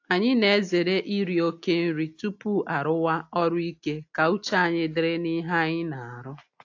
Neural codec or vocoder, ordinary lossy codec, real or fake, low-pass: none; AAC, 48 kbps; real; 7.2 kHz